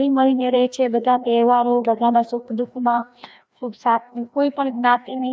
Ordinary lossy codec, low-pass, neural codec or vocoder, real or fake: none; none; codec, 16 kHz, 1 kbps, FreqCodec, larger model; fake